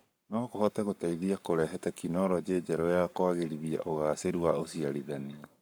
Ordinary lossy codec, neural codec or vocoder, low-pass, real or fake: none; codec, 44.1 kHz, 7.8 kbps, Pupu-Codec; none; fake